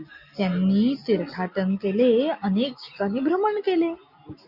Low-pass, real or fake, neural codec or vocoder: 5.4 kHz; real; none